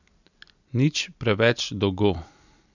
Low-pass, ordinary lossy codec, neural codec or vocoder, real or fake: 7.2 kHz; none; none; real